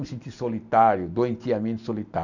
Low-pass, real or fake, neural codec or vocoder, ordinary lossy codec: 7.2 kHz; real; none; none